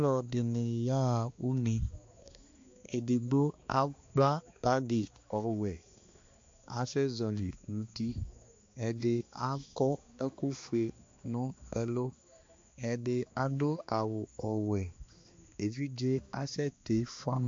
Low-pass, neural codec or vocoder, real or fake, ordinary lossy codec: 7.2 kHz; codec, 16 kHz, 2 kbps, X-Codec, HuBERT features, trained on balanced general audio; fake; MP3, 48 kbps